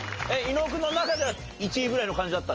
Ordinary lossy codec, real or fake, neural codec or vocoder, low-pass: Opus, 24 kbps; real; none; 7.2 kHz